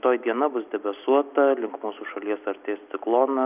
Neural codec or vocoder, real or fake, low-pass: none; real; 3.6 kHz